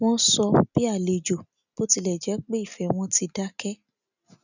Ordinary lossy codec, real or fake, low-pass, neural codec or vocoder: none; real; 7.2 kHz; none